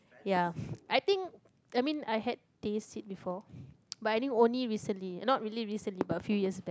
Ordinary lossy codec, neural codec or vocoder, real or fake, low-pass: none; none; real; none